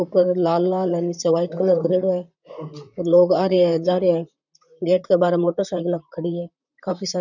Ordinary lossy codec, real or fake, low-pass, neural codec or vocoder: none; fake; 7.2 kHz; vocoder, 44.1 kHz, 128 mel bands, Pupu-Vocoder